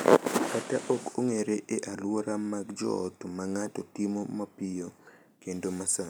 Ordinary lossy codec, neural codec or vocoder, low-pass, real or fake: none; none; none; real